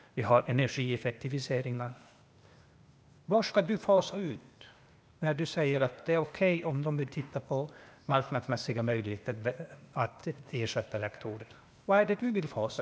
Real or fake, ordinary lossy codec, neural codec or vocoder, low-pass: fake; none; codec, 16 kHz, 0.8 kbps, ZipCodec; none